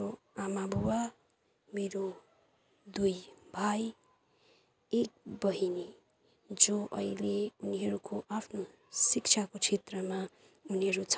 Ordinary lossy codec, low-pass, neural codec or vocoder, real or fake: none; none; none; real